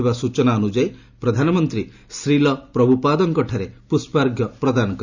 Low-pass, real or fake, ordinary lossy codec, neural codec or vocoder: 7.2 kHz; real; none; none